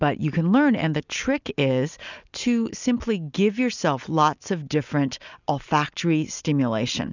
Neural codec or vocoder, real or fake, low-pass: none; real; 7.2 kHz